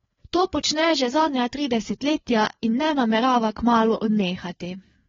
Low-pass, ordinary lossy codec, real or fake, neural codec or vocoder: 7.2 kHz; AAC, 24 kbps; fake; codec, 16 kHz, 4 kbps, FreqCodec, larger model